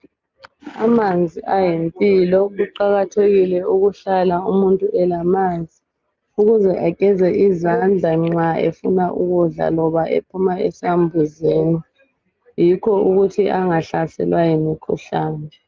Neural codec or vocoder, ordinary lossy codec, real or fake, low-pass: none; Opus, 24 kbps; real; 7.2 kHz